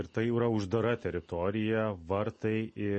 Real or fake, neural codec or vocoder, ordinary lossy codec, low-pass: real; none; MP3, 32 kbps; 10.8 kHz